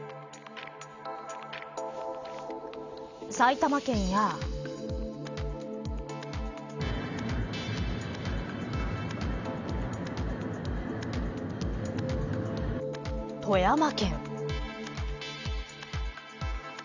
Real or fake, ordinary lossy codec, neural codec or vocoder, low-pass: real; none; none; 7.2 kHz